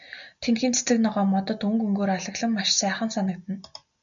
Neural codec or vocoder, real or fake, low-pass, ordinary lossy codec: none; real; 7.2 kHz; MP3, 96 kbps